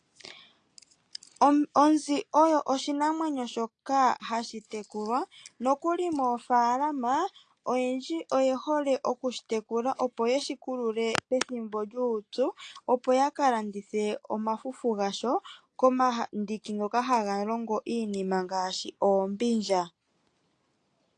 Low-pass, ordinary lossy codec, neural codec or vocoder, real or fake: 9.9 kHz; AAC, 48 kbps; none; real